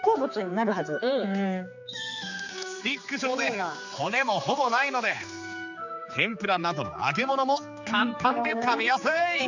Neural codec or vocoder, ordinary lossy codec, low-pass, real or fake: codec, 16 kHz, 4 kbps, X-Codec, HuBERT features, trained on general audio; none; 7.2 kHz; fake